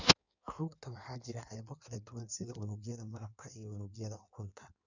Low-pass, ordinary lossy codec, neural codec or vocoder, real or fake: 7.2 kHz; none; codec, 16 kHz in and 24 kHz out, 1.1 kbps, FireRedTTS-2 codec; fake